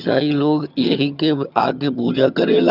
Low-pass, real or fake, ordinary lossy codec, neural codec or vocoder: 5.4 kHz; fake; none; vocoder, 22.05 kHz, 80 mel bands, HiFi-GAN